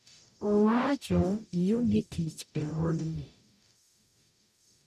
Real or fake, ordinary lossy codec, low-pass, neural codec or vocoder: fake; none; 14.4 kHz; codec, 44.1 kHz, 0.9 kbps, DAC